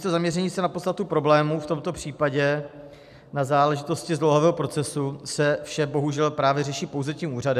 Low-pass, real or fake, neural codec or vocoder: 14.4 kHz; real; none